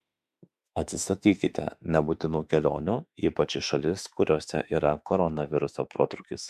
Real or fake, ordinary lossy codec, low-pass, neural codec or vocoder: fake; MP3, 96 kbps; 14.4 kHz; autoencoder, 48 kHz, 32 numbers a frame, DAC-VAE, trained on Japanese speech